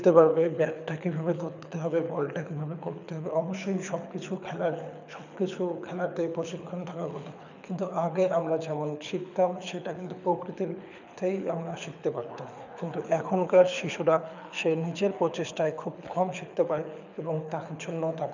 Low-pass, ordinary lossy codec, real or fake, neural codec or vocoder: 7.2 kHz; none; fake; codec, 24 kHz, 6 kbps, HILCodec